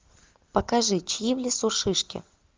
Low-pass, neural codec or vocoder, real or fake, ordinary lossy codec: 7.2 kHz; none; real; Opus, 32 kbps